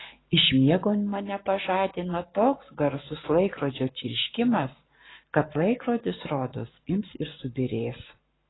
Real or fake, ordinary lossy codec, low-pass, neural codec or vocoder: fake; AAC, 16 kbps; 7.2 kHz; codec, 44.1 kHz, 7.8 kbps, Pupu-Codec